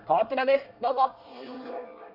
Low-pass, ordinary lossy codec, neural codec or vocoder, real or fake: 5.4 kHz; none; codec, 24 kHz, 1 kbps, SNAC; fake